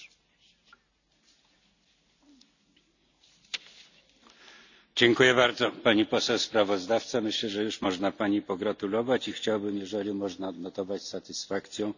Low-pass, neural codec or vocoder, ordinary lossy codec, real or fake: 7.2 kHz; none; none; real